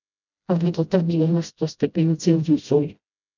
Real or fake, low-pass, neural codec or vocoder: fake; 7.2 kHz; codec, 16 kHz, 0.5 kbps, FreqCodec, smaller model